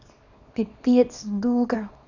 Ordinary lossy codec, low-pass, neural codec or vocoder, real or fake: none; 7.2 kHz; codec, 24 kHz, 0.9 kbps, WavTokenizer, small release; fake